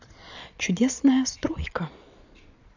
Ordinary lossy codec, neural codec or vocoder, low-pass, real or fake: none; vocoder, 22.05 kHz, 80 mel bands, Vocos; 7.2 kHz; fake